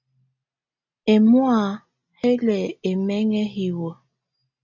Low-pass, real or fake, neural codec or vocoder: 7.2 kHz; real; none